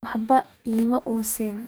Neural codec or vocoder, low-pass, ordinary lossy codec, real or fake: codec, 44.1 kHz, 2.6 kbps, DAC; none; none; fake